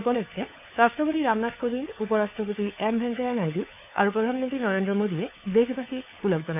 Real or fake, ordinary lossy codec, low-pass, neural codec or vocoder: fake; none; 3.6 kHz; codec, 16 kHz, 4 kbps, FunCodec, trained on LibriTTS, 50 frames a second